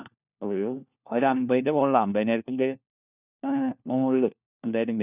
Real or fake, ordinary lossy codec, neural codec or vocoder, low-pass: fake; none; codec, 16 kHz, 1 kbps, FunCodec, trained on LibriTTS, 50 frames a second; 3.6 kHz